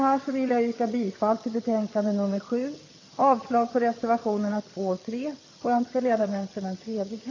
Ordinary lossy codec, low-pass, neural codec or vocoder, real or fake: AAC, 32 kbps; 7.2 kHz; vocoder, 22.05 kHz, 80 mel bands, HiFi-GAN; fake